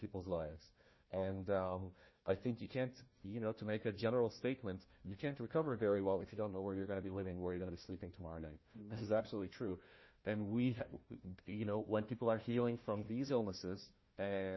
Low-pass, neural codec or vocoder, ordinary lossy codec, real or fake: 7.2 kHz; codec, 16 kHz, 1 kbps, FunCodec, trained on Chinese and English, 50 frames a second; MP3, 24 kbps; fake